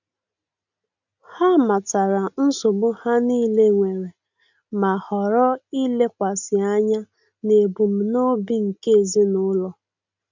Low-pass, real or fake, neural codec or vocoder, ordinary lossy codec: 7.2 kHz; real; none; none